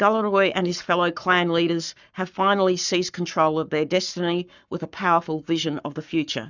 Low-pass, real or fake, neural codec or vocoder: 7.2 kHz; fake; codec, 44.1 kHz, 7.8 kbps, Pupu-Codec